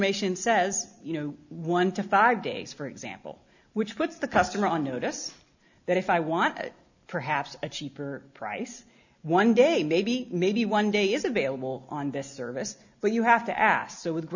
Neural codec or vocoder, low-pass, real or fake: none; 7.2 kHz; real